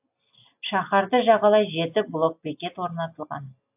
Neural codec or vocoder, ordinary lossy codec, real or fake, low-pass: none; AAC, 32 kbps; real; 3.6 kHz